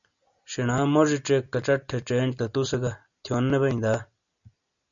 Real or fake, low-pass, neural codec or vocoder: real; 7.2 kHz; none